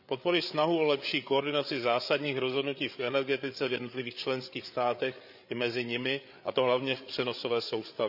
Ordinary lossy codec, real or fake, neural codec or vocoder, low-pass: none; fake; codec, 16 kHz, 8 kbps, FreqCodec, larger model; 5.4 kHz